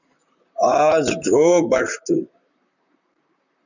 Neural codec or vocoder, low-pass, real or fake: vocoder, 44.1 kHz, 128 mel bands, Pupu-Vocoder; 7.2 kHz; fake